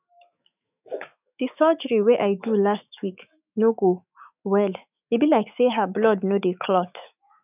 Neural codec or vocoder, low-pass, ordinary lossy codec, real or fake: autoencoder, 48 kHz, 128 numbers a frame, DAC-VAE, trained on Japanese speech; 3.6 kHz; none; fake